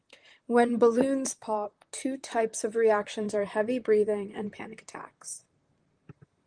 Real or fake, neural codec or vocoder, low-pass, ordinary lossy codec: fake; vocoder, 44.1 kHz, 128 mel bands, Pupu-Vocoder; 9.9 kHz; Opus, 24 kbps